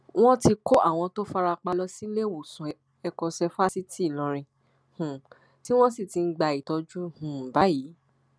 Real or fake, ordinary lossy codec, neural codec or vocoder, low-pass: real; none; none; none